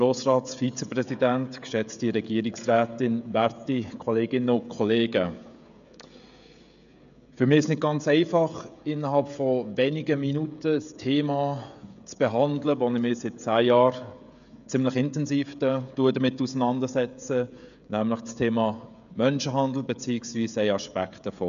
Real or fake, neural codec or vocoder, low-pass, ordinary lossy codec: fake; codec, 16 kHz, 16 kbps, FreqCodec, smaller model; 7.2 kHz; none